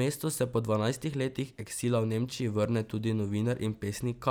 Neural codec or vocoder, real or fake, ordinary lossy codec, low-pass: vocoder, 44.1 kHz, 128 mel bands every 256 samples, BigVGAN v2; fake; none; none